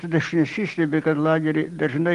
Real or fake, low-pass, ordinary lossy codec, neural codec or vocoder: real; 10.8 kHz; Opus, 24 kbps; none